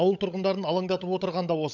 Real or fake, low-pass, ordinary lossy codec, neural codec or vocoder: fake; 7.2 kHz; none; codec, 16 kHz, 16 kbps, FunCodec, trained on LibriTTS, 50 frames a second